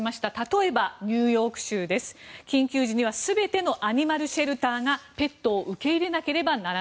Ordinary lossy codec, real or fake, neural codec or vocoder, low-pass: none; real; none; none